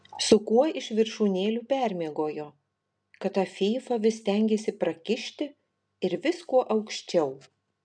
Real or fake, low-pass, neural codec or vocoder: real; 9.9 kHz; none